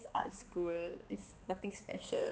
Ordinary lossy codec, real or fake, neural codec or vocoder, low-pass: none; fake; codec, 16 kHz, 2 kbps, X-Codec, HuBERT features, trained on balanced general audio; none